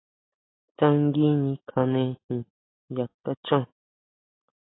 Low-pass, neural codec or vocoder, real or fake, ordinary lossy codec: 7.2 kHz; none; real; AAC, 16 kbps